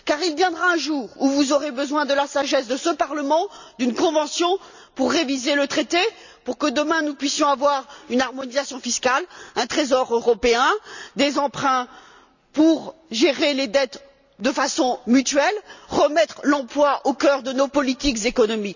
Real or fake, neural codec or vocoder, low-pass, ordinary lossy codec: real; none; 7.2 kHz; none